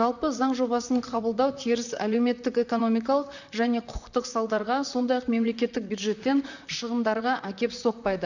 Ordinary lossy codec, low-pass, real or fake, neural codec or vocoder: none; 7.2 kHz; fake; vocoder, 44.1 kHz, 128 mel bands, Pupu-Vocoder